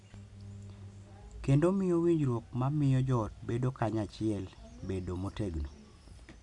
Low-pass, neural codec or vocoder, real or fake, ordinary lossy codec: 10.8 kHz; none; real; none